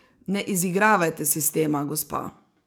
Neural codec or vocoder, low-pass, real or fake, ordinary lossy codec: codec, 44.1 kHz, 7.8 kbps, DAC; none; fake; none